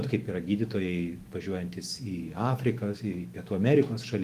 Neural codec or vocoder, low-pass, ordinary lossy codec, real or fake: autoencoder, 48 kHz, 128 numbers a frame, DAC-VAE, trained on Japanese speech; 14.4 kHz; Opus, 24 kbps; fake